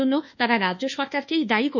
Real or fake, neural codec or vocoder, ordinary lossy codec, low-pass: fake; codec, 24 kHz, 1.2 kbps, DualCodec; none; 7.2 kHz